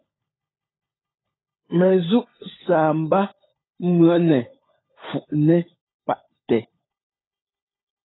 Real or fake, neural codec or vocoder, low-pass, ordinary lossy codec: fake; codec, 16 kHz, 16 kbps, FunCodec, trained on LibriTTS, 50 frames a second; 7.2 kHz; AAC, 16 kbps